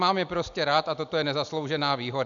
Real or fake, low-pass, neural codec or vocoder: real; 7.2 kHz; none